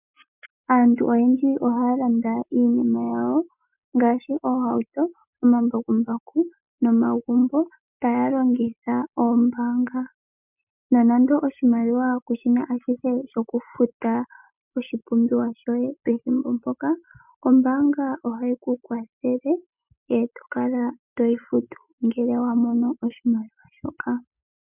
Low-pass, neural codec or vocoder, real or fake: 3.6 kHz; none; real